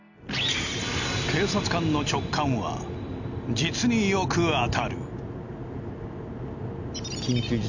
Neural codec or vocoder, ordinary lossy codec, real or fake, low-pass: none; none; real; 7.2 kHz